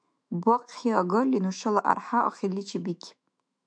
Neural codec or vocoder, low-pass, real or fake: autoencoder, 48 kHz, 128 numbers a frame, DAC-VAE, trained on Japanese speech; 9.9 kHz; fake